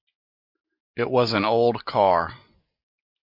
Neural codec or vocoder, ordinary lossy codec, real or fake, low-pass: none; MP3, 48 kbps; real; 5.4 kHz